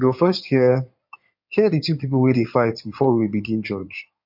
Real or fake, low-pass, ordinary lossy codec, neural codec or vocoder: fake; 5.4 kHz; MP3, 48 kbps; codec, 16 kHz in and 24 kHz out, 2.2 kbps, FireRedTTS-2 codec